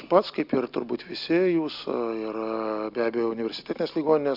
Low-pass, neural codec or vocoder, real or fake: 5.4 kHz; none; real